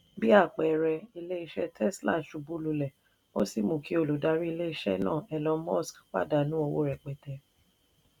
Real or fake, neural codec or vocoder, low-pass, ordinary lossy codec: real; none; 19.8 kHz; none